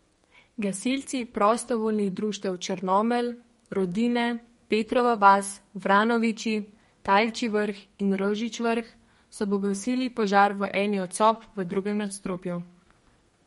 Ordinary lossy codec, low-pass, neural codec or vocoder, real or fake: MP3, 48 kbps; 14.4 kHz; codec, 32 kHz, 1.9 kbps, SNAC; fake